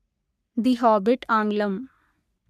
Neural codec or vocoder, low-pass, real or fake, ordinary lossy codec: codec, 44.1 kHz, 3.4 kbps, Pupu-Codec; 14.4 kHz; fake; none